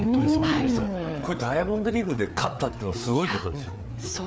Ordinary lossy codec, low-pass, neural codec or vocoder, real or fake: none; none; codec, 16 kHz, 4 kbps, FreqCodec, larger model; fake